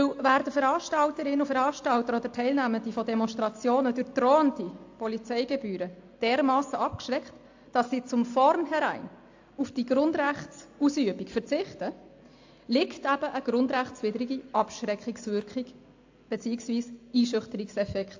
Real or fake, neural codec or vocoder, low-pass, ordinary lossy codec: real; none; 7.2 kHz; AAC, 48 kbps